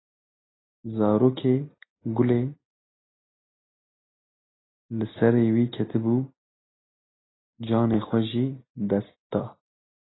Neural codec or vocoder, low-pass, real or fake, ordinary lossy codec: none; 7.2 kHz; real; AAC, 16 kbps